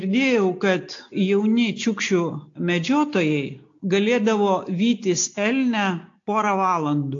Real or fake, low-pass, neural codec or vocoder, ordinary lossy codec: real; 7.2 kHz; none; AAC, 48 kbps